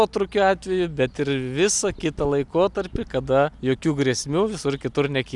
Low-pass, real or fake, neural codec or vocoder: 10.8 kHz; real; none